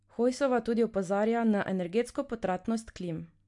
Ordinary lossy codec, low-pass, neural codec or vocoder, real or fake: MP3, 64 kbps; 10.8 kHz; none; real